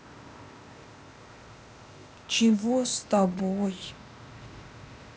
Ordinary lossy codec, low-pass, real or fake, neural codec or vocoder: none; none; fake; codec, 16 kHz, 0.8 kbps, ZipCodec